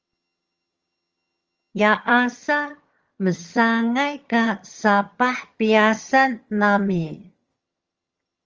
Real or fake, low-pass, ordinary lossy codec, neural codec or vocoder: fake; 7.2 kHz; Opus, 32 kbps; vocoder, 22.05 kHz, 80 mel bands, HiFi-GAN